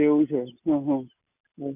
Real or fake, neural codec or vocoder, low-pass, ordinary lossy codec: real; none; 3.6 kHz; none